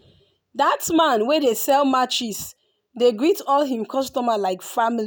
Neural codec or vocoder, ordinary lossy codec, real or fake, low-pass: none; none; real; none